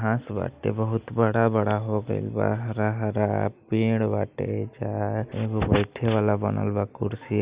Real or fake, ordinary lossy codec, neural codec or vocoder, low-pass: real; Opus, 64 kbps; none; 3.6 kHz